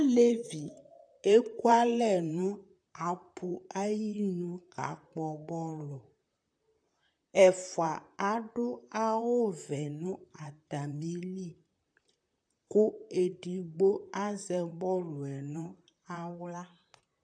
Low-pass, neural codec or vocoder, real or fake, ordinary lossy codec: 9.9 kHz; vocoder, 44.1 kHz, 128 mel bands, Pupu-Vocoder; fake; AAC, 64 kbps